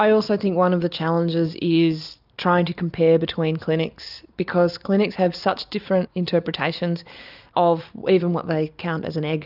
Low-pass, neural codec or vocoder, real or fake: 5.4 kHz; none; real